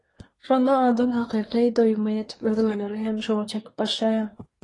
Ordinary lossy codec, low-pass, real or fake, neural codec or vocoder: AAC, 32 kbps; 10.8 kHz; fake; codec, 24 kHz, 1 kbps, SNAC